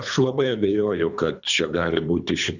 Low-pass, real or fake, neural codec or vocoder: 7.2 kHz; fake; codec, 24 kHz, 3 kbps, HILCodec